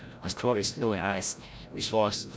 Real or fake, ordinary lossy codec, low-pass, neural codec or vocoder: fake; none; none; codec, 16 kHz, 0.5 kbps, FreqCodec, larger model